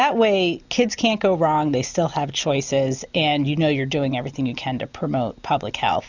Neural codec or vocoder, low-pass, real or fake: none; 7.2 kHz; real